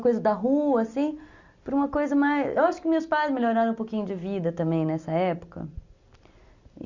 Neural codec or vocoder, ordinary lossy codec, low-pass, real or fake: none; Opus, 64 kbps; 7.2 kHz; real